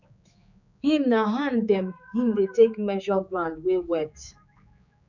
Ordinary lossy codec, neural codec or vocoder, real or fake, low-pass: none; codec, 16 kHz, 4 kbps, X-Codec, HuBERT features, trained on general audio; fake; 7.2 kHz